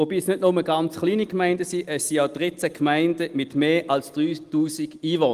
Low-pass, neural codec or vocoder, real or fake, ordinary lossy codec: 14.4 kHz; none; real; Opus, 24 kbps